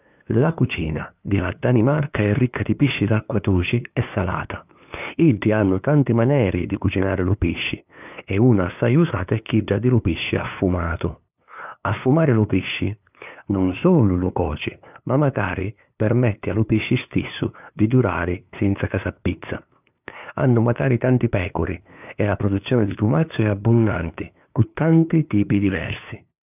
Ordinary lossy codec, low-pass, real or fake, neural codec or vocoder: none; 3.6 kHz; fake; codec, 16 kHz, 2 kbps, FunCodec, trained on LibriTTS, 25 frames a second